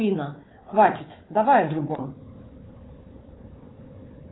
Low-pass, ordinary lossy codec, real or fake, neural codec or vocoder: 7.2 kHz; AAC, 16 kbps; fake; codec, 16 kHz, 4 kbps, FunCodec, trained on Chinese and English, 50 frames a second